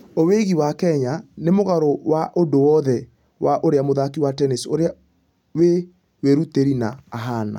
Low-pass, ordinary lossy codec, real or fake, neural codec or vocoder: 19.8 kHz; none; real; none